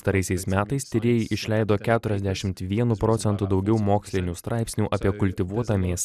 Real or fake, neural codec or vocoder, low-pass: real; none; 14.4 kHz